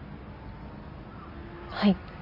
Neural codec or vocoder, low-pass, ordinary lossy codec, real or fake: none; 5.4 kHz; none; real